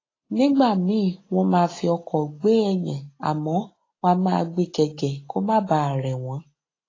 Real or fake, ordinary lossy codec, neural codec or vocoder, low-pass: real; AAC, 32 kbps; none; 7.2 kHz